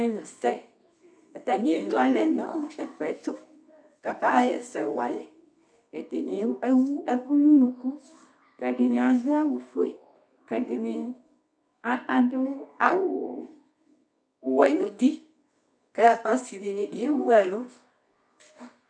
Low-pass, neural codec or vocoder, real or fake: 9.9 kHz; codec, 24 kHz, 0.9 kbps, WavTokenizer, medium music audio release; fake